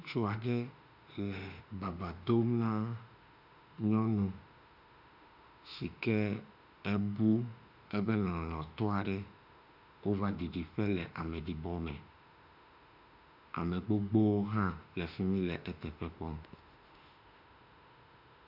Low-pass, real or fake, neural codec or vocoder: 5.4 kHz; fake; autoencoder, 48 kHz, 32 numbers a frame, DAC-VAE, trained on Japanese speech